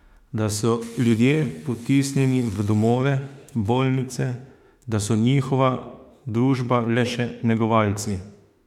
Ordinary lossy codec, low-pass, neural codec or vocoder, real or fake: MP3, 96 kbps; 19.8 kHz; autoencoder, 48 kHz, 32 numbers a frame, DAC-VAE, trained on Japanese speech; fake